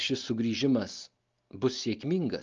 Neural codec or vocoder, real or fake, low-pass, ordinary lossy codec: none; real; 7.2 kHz; Opus, 32 kbps